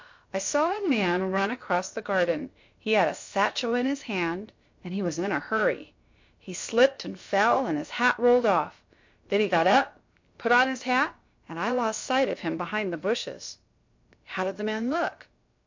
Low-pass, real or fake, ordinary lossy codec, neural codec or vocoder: 7.2 kHz; fake; MP3, 48 kbps; codec, 16 kHz, about 1 kbps, DyCAST, with the encoder's durations